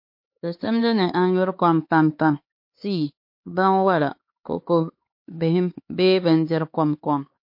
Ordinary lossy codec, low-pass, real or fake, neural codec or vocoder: MP3, 32 kbps; 5.4 kHz; fake; codec, 16 kHz, 4 kbps, X-Codec, HuBERT features, trained on LibriSpeech